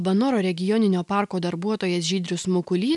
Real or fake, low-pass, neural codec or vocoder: real; 10.8 kHz; none